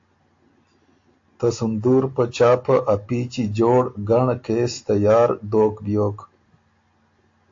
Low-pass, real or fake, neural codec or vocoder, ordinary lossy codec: 7.2 kHz; real; none; AAC, 48 kbps